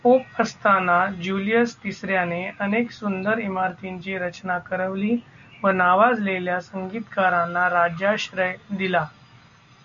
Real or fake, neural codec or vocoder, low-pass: real; none; 7.2 kHz